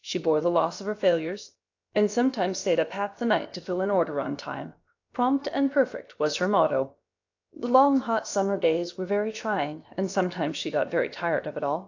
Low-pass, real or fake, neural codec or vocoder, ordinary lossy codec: 7.2 kHz; fake; codec, 16 kHz, about 1 kbps, DyCAST, with the encoder's durations; AAC, 48 kbps